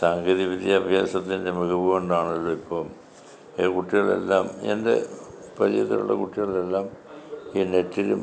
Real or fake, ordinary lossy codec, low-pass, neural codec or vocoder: real; none; none; none